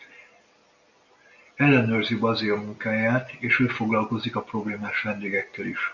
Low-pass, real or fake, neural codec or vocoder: 7.2 kHz; real; none